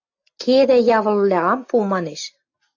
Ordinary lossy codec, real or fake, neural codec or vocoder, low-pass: AAC, 48 kbps; real; none; 7.2 kHz